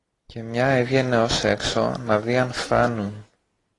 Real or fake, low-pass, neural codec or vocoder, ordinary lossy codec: real; 10.8 kHz; none; AAC, 32 kbps